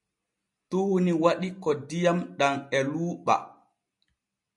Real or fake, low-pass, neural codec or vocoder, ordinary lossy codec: real; 10.8 kHz; none; MP3, 48 kbps